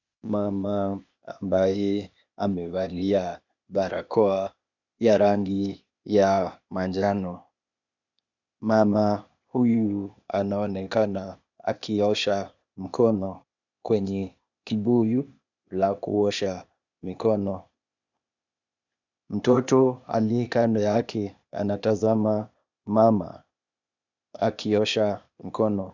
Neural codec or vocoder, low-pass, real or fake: codec, 16 kHz, 0.8 kbps, ZipCodec; 7.2 kHz; fake